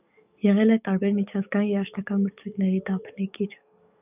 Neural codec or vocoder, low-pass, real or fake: codec, 44.1 kHz, 7.8 kbps, DAC; 3.6 kHz; fake